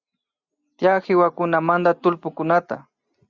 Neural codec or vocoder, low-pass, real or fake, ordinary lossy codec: none; 7.2 kHz; real; Opus, 64 kbps